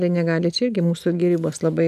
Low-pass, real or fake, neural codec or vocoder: 14.4 kHz; real; none